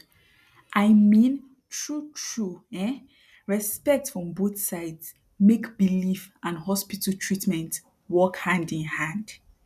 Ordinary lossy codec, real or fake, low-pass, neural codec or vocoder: none; real; 14.4 kHz; none